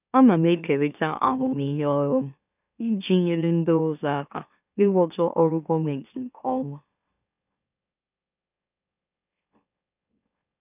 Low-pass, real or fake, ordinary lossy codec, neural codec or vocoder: 3.6 kHz; fake; none; autoencoder, 44.1 kHz, a latent of 192 numbers a frame, MeloTTS